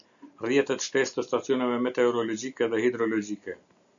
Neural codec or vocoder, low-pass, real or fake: none; 7.2 kHz; real